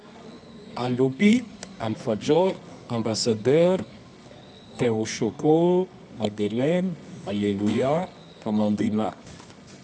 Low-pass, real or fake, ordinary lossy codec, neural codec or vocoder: none; fake; none; codec, 24 kHz, 0.9 kbps, WavTokenizer, medium music audio release